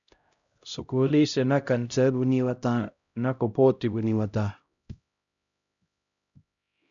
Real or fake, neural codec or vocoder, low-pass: fake; codec, 16 kHz, 0.5 kbps, X-Codec, HuBERT features, trained on LibriSpeech; 7.2 kHz